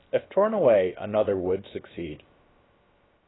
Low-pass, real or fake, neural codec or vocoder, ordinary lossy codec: 7.2 kHz; fake; codec, 16 kHz, about 1 kbps, DyCAST, with the encoder's durations; AAC, 16 kbps